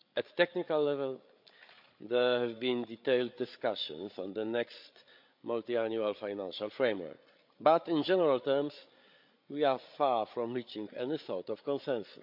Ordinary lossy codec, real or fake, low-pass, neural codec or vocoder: MP3, 48 kbps; fake; 5.4 kHz; codec, 16 kHz, 8 kbps, FreqCodec, larger model